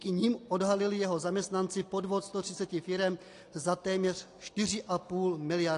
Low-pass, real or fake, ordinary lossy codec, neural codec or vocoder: 10.8 kHz; real; AAC, 48 kbps; none